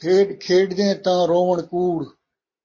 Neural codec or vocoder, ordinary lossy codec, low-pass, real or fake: none; MP3, 32 kbps; 7.2 kHz; real